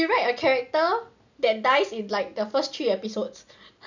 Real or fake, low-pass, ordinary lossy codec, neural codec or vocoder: real; 7.2 kHz; none; none